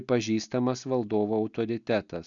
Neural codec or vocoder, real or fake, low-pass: none; real; 7.2 kHz